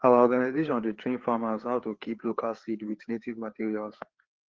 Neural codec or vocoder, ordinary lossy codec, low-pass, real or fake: codec, 16 kHz, 4 kbps, FreqCodec, larger model; Opus, 16 kbps; 7.2 kHz; fake